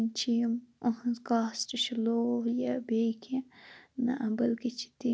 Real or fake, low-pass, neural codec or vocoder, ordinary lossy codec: real; none; none; none